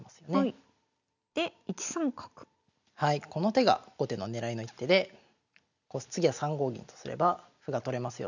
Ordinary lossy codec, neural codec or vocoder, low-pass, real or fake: none; none; 7.2 kHz; real